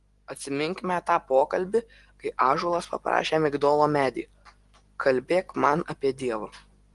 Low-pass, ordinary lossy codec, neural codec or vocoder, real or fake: 10.8 kHz; Opus, 24 kbps; none; real